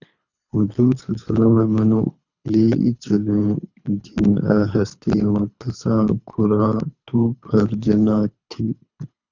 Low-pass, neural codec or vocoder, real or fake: 7.2 kHz; codec, 24 kHz, 3 kbps, HILCodec; fake